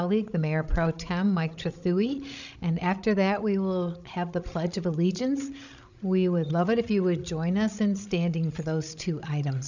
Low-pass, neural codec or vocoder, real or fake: 7.2 kHz; codec, 16 kHz, 16 kbps, FreqCodec, larger model; fake